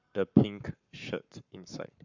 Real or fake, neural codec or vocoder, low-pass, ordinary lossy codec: fake; codec, 44.1 kHz, 7.8 kbps, Pupu-Codec; 7.2 kHz; none